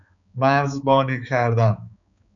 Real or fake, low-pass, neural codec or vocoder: fake; 7.2 kHz; codec, 16 kHz, 4 kbps, X-Codec, HuBERT features, trained on balanced general audio